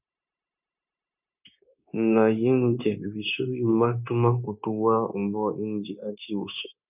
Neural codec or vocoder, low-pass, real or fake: codec, 16 kHz, 0.9 kbps, LongCat-Audio-Codec; 3.6 kHz; fake